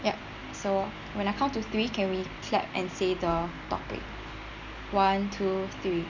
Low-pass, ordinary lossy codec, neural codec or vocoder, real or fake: 7.2 kHz; none; none; real